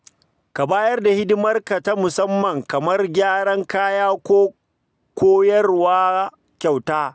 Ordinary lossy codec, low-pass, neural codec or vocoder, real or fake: none; none; none; real